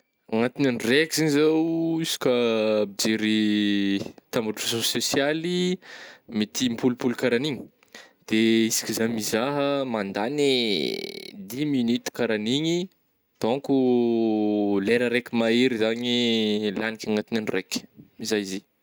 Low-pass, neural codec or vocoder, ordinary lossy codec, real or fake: none; none; none; real